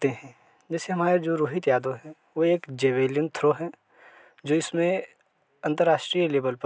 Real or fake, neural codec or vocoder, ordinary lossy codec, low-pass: real; none; none; none